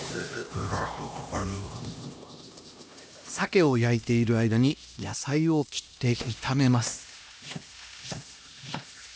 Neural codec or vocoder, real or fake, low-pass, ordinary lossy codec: codec, 16 kHz, 1 kbps, X-Codec, HuBERT features, trained on LibriSpeech; fake; none; none